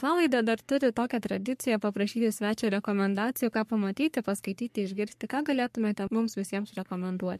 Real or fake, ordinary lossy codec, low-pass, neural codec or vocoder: fake; MP3, 64 kbps; 14.4 kHz; codec, 44.1 kHz, 3.4 kbps, Pupu-Codec